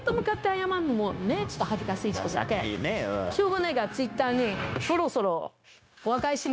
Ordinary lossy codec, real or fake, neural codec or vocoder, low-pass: none; fake; codec, 16 kHz, 0.9 kbps, LongCat-Audio-Codec; none